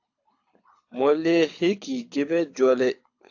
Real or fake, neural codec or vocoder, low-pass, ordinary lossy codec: fake; codec, 24 kHz, 6 kbps, HILCodec; 7.2 kHz; AAC, 48 kbps